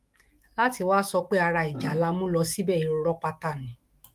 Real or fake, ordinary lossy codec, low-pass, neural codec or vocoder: fake; Opus, 32 kbps; 14.4 kHz; autoencoder, 48 kHz, 128 numbers a frame, DAC-VAE, trained on Japanese speech